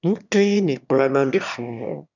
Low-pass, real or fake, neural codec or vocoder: 7.2 kHz; fake; autoencoder, 22.05 kHz, a latent of 192 numbers a frame, VITS, trained on one speaker